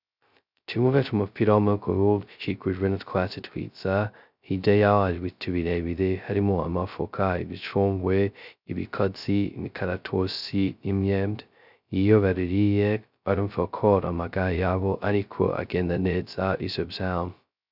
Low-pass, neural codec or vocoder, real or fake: 5.4 kHz; codec, 16 kHz, 0.2 kbps, FocalCodec; fake